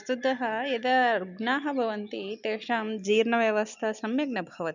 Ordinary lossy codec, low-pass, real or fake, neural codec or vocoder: none; 7.2 kHz; real; none